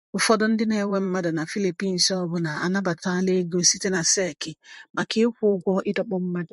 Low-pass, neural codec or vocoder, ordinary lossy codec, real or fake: 14.4 kHz; vocoder, 44.1 kHz, 128 mel bands, Pupu-Vocoder; MP3, 48 kbps; fake